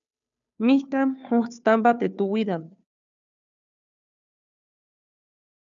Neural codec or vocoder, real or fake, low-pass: codec, 16 kHz, 2 kbps, FunCodec, trained on Chinese and English, 25 frames a second; fake; 7.2 kHz